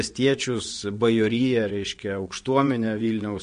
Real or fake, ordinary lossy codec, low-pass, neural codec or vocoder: fake; MP3, 48 kbps; 9.9 kHz; vocoder, 22.05 kHz, 80 mel bands, WaveNeXt